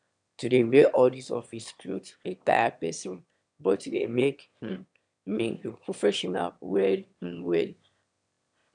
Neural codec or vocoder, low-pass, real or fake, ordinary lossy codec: autoencoder, 22.05 kHz, a latent of 192 numbers a frame, VITS, trained on one speaker; 9.9 kHz; fake; none